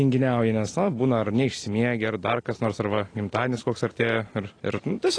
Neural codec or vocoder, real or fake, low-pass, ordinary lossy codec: none; real; 9.9 kHz; AAC, 32 kbps